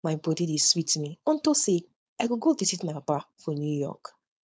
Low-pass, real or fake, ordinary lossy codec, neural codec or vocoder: none; fake; none; codec, 16 kHz, 4.8 kbps, FACodec